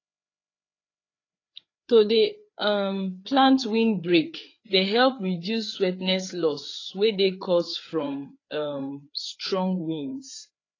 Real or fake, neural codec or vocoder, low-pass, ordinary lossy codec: fake; codec, 16 kHz, 4 kbps, FreqCodec, larger model; 7.2 kHz; AAC, 32 kbps